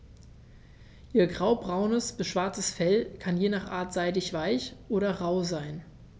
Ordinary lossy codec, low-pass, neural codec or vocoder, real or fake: none; none; none; real